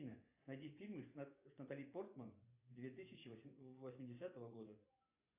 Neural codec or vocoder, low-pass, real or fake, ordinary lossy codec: vocoder, 24 kHz, 100 mel bands, Vocos; 3.6 kHz; fake; AAC, 32 kbps